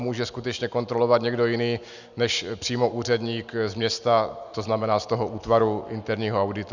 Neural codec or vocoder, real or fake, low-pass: none; real; 7.2 kHz